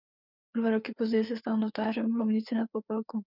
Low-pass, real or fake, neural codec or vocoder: 5.4 kHz; fake; codec, 16 kHz, 4 kbps, FreqCodec, larger model